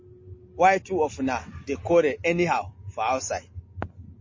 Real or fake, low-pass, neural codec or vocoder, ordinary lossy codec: real; 7.2 kHz; none; MP3, 32 kbps